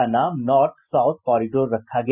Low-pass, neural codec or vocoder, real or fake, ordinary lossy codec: 3.6 kHz; none; real; none